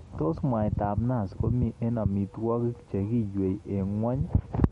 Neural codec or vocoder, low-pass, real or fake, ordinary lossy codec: none; 19.8 kHz; real; MP3, 48 kbps